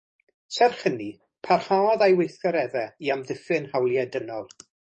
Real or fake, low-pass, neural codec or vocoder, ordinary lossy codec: fake; 10.8 kHz; codec, 44.1 kHz, 7.8 kbps, DAC; MP3, 32 kbps